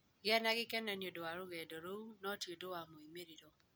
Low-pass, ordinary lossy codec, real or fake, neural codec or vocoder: none; none; real; none